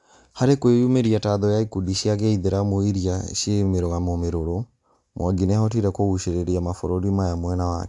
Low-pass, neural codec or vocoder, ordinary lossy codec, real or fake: 10.8 kHz; none; AAC, 64 kbps; real